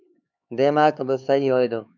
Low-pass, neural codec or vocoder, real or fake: 7.2 kHz; codec, 16 kHz, 4 kbps, X-Codec, HuBERT features, trained on LibriSpeech; fake